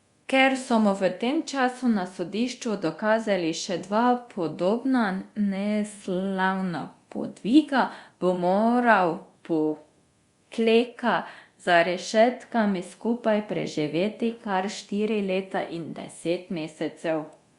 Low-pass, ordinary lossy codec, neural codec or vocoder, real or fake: 10.8 kHz; Opus, 64 kbps; codec, 24 kHz, 0.9 kbps, DualCodec; fake